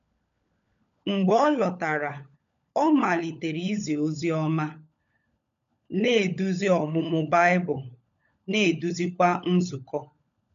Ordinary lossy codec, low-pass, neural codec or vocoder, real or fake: MP3, 64 kbps; 7.2 kHz; codec, 16 kHz, 16 kbps, FunCodec, trained on LibriTTS, 50 frames a second; fake